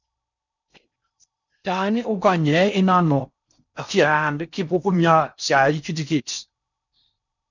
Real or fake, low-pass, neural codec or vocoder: fake; 7.2 kHz; codec, 16 kHz in and 24 kHz out, 0.6 kbps, FocalCodec, streaming, 4096 codes